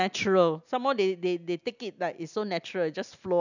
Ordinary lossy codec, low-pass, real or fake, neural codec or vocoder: none; 7.2 kHz; real; none